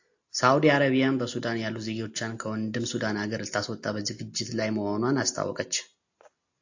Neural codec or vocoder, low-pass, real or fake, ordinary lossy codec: none; 7.2 kHz; real; AAC, 48 kbps